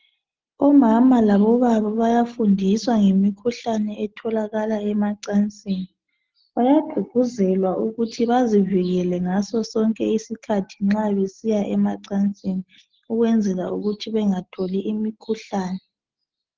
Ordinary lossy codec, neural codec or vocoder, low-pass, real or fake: Opus, 16 kbps; none; 7.2 kHz; real